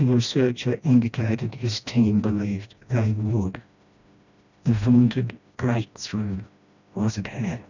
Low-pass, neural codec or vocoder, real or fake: 7.2 kHz; codec, 16 kHz, 1 kbps, FreqCodec, smaller model; fake